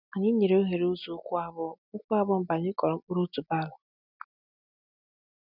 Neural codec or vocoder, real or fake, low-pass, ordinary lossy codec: none; real; 5.4 kHz; none